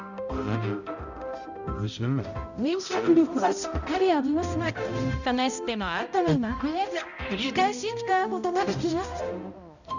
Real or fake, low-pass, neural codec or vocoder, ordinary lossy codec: fake; 7.2 kHz; codec, 16 kHz, 0.5 kbps, X-Codec, HuBERT features, trained on balanced general audio; none